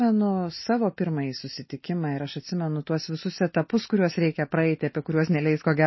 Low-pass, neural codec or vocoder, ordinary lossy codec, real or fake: 7.2 kHz; none; MP3, 24 kbps; real